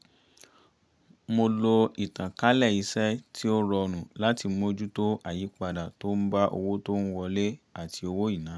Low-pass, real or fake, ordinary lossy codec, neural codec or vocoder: none; real; none; none